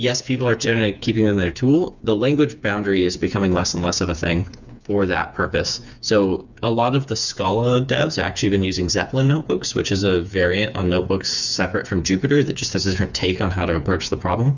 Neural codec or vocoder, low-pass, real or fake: codec, 16 kHz, 4 kbps, FreqCodec, smaller model; 7.2 kHz; fake